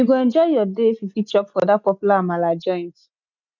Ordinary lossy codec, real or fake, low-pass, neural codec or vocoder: none; real; 7.2 kHz; none